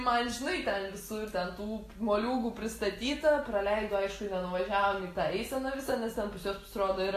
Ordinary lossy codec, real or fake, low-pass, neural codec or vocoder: AAC, 48 kbps; real; 14.4 kHz; none